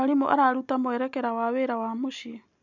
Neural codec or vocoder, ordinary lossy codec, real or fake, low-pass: none; none; real; 7.2 kHz